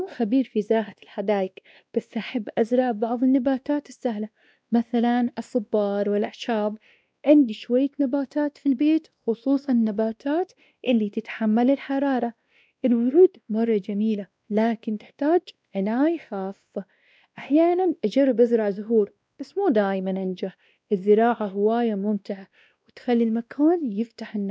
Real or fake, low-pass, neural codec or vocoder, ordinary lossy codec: fake; none; codec, 16 kHz, 1 kbps, X-Codec, WavLM features, trained on Multilingual LibriSpeech; none